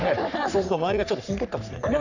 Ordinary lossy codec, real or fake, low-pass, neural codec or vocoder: none; fake; 7.2 kHz; codec, 44.1 kHz, 3.4 kbps, Pupu-Codec